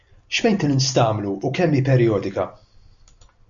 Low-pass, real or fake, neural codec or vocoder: 7.2 kHz; real; none